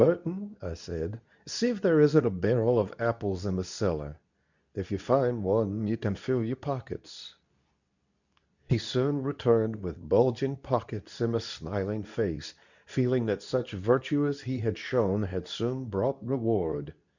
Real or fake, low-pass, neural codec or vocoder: fake; 7.2 kHz; codec, 24 kHz, 0.9 kbps, WavTokenizer, medium speech release version 2